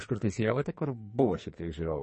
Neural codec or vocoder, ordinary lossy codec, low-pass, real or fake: codec, 44.1 kHz, 2.6 kbps, SNAC; MP3, 32 kbps; 10.8 kHz; fake